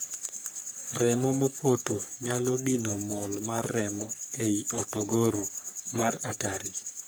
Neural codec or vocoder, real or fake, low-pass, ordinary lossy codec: codec, 44.1 kHz, 3.4 kbps, Pupu-Codec; fake; none; none